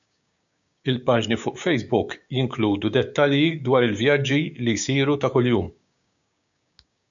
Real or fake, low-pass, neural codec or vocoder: fake; 7.2 kHz; codec, 16 kHz, 6 kbps, DAC